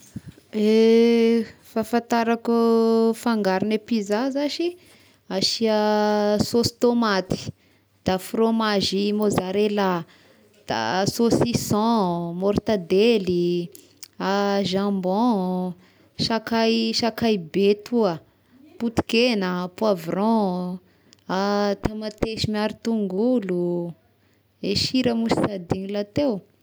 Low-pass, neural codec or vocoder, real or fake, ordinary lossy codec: none; none; real; none